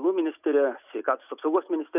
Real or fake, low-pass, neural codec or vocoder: real; 3.6 kHz; none